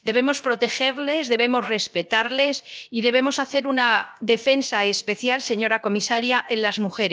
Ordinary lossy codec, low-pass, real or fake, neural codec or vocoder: none; none; fake; codec, 16 kHz, about 1 kbps, DyCAST, with the encoder's durations